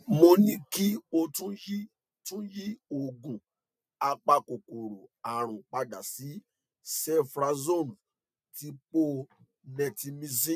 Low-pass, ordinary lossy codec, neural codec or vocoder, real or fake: 14.4 kHz; none; none; real